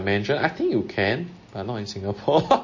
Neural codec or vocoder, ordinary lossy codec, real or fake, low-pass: none; MP3, 32 kbps; real; 7.2 kHz